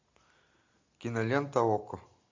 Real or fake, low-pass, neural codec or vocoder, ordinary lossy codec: real; 7.2 kHz; none; MP3, 64 kbps